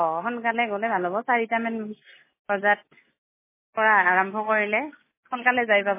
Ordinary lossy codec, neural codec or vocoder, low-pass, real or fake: MP3, 16 kbps; none; 3.6 kHz; real